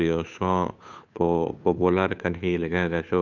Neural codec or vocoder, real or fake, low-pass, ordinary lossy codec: codec, 16 kHz, 2 kbps, FunCodec, trained on Chinese and English, 25 frames a second; fake; 7.2 kHz; none